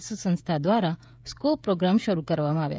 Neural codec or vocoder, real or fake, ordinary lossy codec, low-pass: codec, 16 kHz, 16 kbps, FreqCodec, smaller model; fake; none; none